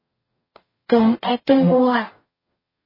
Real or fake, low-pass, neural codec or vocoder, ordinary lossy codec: fake; 5.4 kHz; codec, 44.1 kHz, 0.9 kbps, DAC; MP3, 24 kbps